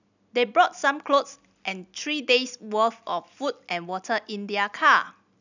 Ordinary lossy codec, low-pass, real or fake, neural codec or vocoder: none; 7.2 kHz; real; none